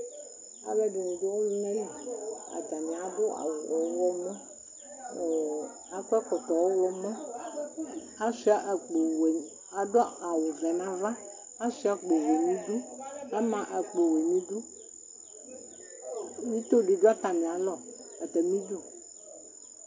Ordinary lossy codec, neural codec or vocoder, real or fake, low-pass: MP3, 64 kbps; none; real; 7.2 kHz